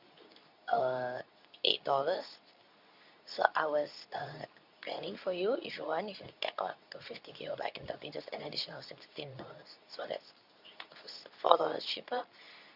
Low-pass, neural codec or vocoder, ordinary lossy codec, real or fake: 5.4 kHz; codec, 24 kHz, 0.9 kbps, WavTokenizer, medium speech release version 2; none; fake